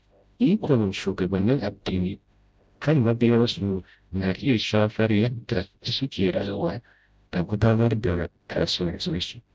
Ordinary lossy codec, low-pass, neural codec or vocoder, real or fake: none; none; codec, 16 kHz, 0.5 kbps, FreqCodec, smaller model; fake